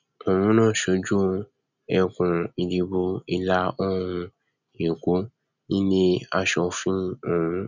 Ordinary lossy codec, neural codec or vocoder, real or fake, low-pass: none; none; real; 7.2 kHz